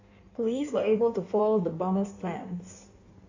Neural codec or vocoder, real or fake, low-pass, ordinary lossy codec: codec, 16 kHz in and 24 kHz out, 1.1 kbps, FireRedTTS-2 codec; fake; 7.2 kHz; AAC, 48 kbps